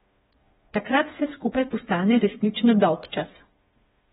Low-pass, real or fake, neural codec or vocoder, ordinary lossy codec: 7.2 kHz; fake; codec, 16 kHz, 4 kbps, FreqCodec, smaller model; AAC, 16 kbps